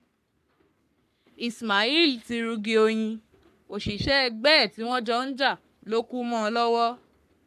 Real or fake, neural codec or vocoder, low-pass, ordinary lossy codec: fake; codec, 44.1 kHz, 3.4 kbps, Pupu-Codec; 14.4 kHz; none